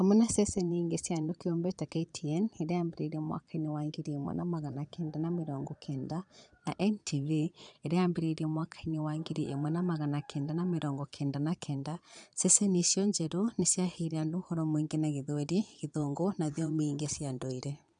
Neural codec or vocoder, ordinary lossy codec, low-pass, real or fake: vocoder, 22.05 kHz, 80 mel bands, Vocos; none; 9.9 kHz; fake